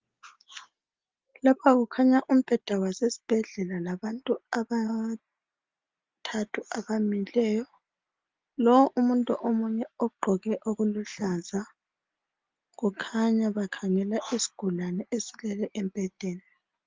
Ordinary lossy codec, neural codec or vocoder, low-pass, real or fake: Opus, 32 kbps; none; 7.2 kHz; real